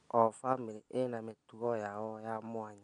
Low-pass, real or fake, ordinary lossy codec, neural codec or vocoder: 9.9 kHz; real; none; none